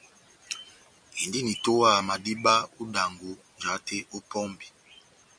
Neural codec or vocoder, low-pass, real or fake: none; 9.9 kHz; real